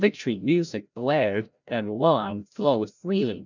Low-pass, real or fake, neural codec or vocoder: 7.2 kHz; fake; codec, 16 kHz, 0.5 kbps, FreqCodec, larger model